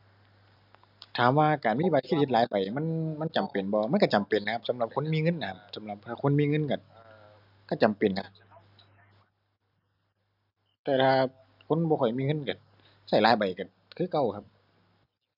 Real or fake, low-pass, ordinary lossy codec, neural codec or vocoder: real; 5.4 kHz; none; none